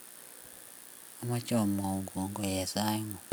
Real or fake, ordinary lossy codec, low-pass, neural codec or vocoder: real; none; none; none